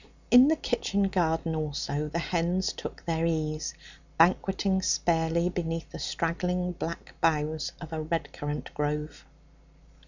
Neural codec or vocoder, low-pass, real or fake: none; 7.2 kHz; real